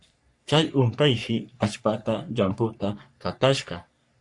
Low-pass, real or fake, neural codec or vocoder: 10.8 kHz; fake; codec, 44.1 kHz, 3.4 kbps, Pupu-Codec